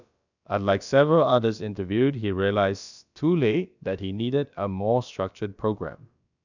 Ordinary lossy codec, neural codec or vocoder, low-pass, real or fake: none; codec, 16 kHz, about 1 kbps, DyCAST, with the encoder's durations; 7.2 kHz; fake